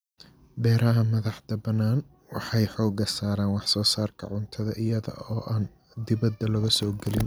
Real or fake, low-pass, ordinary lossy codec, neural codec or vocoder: real; none; none; none